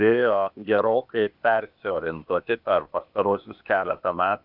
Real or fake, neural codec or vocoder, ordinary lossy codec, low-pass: fake; codec, 16 kHz, 0.8 kbps, ZipCodec; AAC, 48 kbps; 5.4 kHz